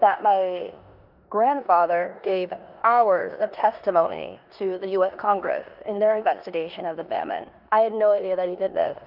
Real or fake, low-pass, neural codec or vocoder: fake; 5.4 kHz; codec, 16 kHz in and 24 kHz out, 0.9 kbps, LongCat-Audio-Codec, four codebook decoder